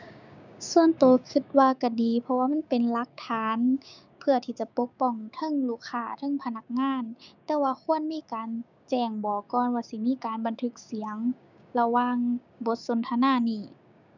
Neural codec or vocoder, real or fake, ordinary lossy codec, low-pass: codec, 16 kHz, 6 kbps, DAC; fake; none; 7.2 kHz